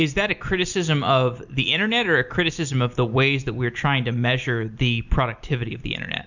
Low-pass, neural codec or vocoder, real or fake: 7.2 kHz; none; real